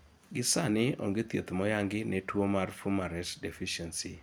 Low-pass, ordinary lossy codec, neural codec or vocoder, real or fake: none; none; none; real